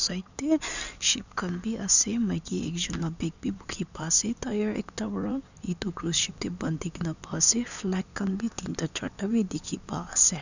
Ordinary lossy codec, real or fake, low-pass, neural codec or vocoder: none; fake; 7.2 kHz; codec, 16 kHz in and 24 kHz out, 2.2 kbps, FireRedTTS-2 codec